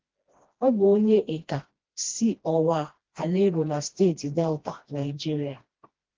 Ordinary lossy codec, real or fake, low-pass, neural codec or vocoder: Opus, 16 kbps; fake; 7.2 kHz; codec, 16 kHz, 1 kbps, FreqCodec, smaller model